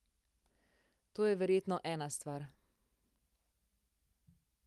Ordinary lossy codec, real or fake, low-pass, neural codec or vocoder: Opus, 32 kbps; fake; 14.4 kHz; vocoder, 44.1 kHz, 128 mel bands every 512 samples, BigVGAN v2